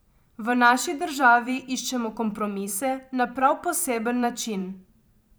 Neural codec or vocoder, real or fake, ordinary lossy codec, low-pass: vocoder, 44.1 kHz, 128 mel bands every 512 samples, BigVGAN v2; fake; none; none